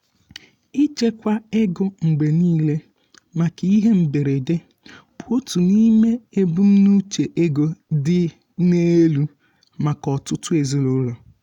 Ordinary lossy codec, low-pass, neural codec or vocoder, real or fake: none; 19.8 kHz; none; real